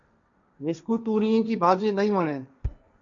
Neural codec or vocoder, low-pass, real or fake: codec, 16 kHz, 1.1 kbps, Voila-Tokenizer; 7.2 kHz; fake